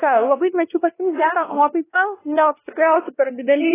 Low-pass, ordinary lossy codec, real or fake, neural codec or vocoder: 3.6 kHz; AAC, 16 kbps; fake; codec, 16 kHz, 1 kbps, X-Codec, WavLM features, trained on Multilingual LibriSpeech